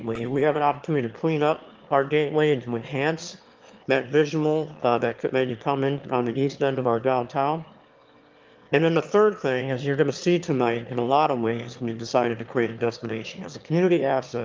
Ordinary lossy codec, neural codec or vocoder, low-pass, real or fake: Opus, 24 kbps; autoencoder, 22.05 kHz, a latent of 192 numbers a frame, VITS, trained on one speaker; 7.2 kHz; fake